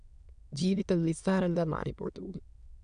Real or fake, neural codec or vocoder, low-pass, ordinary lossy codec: fake; autoencoder, 22.05 kHz, a latent of 192 numbers a frame, VITS, trained on many speakers; 9.9 kHz; none